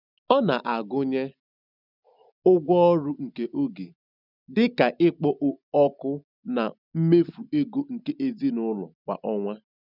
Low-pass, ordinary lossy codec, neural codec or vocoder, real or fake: 5.4 kHz; none; none; real